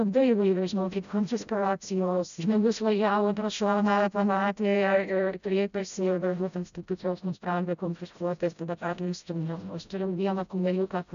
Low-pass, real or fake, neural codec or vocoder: 7.2 kHz; fake; codec, 16 kHz, 0.5 kbps, FreqCodec, smaller model